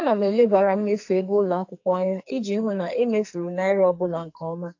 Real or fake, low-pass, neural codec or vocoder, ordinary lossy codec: fake; 7.2 kHz; codec, 44.1 kHz, 2.6 kbps, SNAC; AAC, 48 kbps